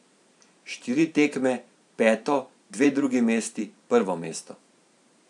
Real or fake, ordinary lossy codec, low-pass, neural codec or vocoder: fake; none; 10.8 kHz; vocoder, 44.1 kHz, 128 mel bands every 512 samples, BigVGAN v2